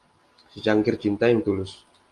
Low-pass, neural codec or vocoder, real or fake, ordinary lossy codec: 10.8 kHz; none; real; Opus, 32 kbps